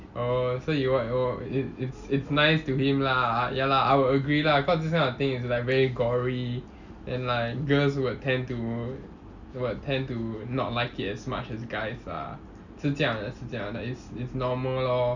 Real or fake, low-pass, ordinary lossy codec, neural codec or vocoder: real; 7.2 kHz; none; none